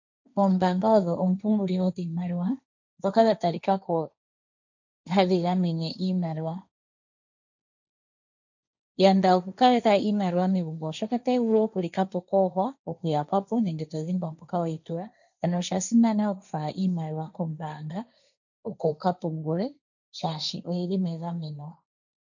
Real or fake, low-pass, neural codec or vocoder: fake; 7.2 kHz; codec, 16 kHz, 1.1 kbps, Voila-Tokenizer